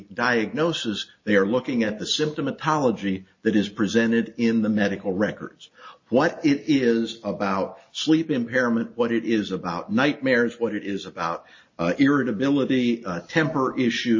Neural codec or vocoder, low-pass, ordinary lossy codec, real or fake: none; 7.2 kHz; MP3, 32 kbps; real